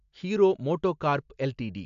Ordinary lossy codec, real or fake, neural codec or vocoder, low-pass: AAC, 64 kbps; real; none; 7.2 kHz